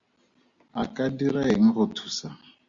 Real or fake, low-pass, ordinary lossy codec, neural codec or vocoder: real; 7.2 kHz; Opus, 64 kbps; none